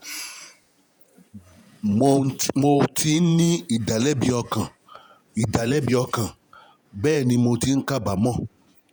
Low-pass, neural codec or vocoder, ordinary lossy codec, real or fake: none; vocoder, 48 kHz, 128 mel bands, Vocos; none; fake